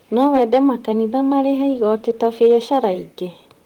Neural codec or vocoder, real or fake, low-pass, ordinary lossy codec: vocoder, 44.1 kHz, 128 mel bands, Pupu-Vocoder; fake; 19.8 kHz; Opus, 24 kbps